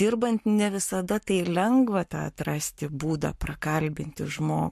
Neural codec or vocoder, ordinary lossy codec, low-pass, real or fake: codec, 44.1 kHz, 7.8 kbps, Pupu-Codec; MP3, 64 kbps; 14.4 kHz; fake